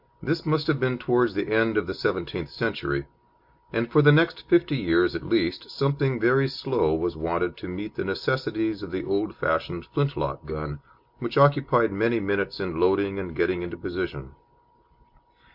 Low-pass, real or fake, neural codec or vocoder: 5.4 kHz; real; none